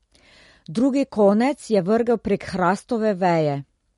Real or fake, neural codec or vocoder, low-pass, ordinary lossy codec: real; none; 19.8 kHz; MP3, 48 kbps